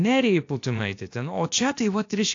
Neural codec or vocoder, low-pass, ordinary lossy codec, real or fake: codec, 16 kHz, about 1 kbps, DyCAST, with the encoder's durations; 7.2 kHz; AAC, 48 kbps; fake